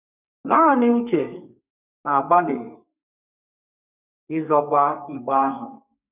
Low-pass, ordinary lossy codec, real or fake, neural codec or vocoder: 3.6 kHz; none; fake; codec, 32 kHz, 1.9 kbps, SNAC